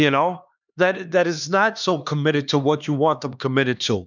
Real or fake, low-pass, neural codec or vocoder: fake; 7.2 kHz; codec, 16 kHz, 2 kbps, X-Codec, HuBERT features, trained on LibriSpeech